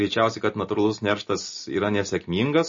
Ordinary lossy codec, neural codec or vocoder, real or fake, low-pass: MP3, 32 kbps; none; real; 7.2 kHz